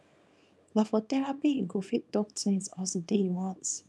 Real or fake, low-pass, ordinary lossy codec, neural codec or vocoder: fake; none; none; codec, 24 kHz, 0.9 kbps, WavTokenizer, small release